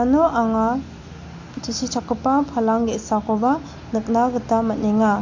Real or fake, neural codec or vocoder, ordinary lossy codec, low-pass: real; none; MP3, 48 kbps; 7.2 kHz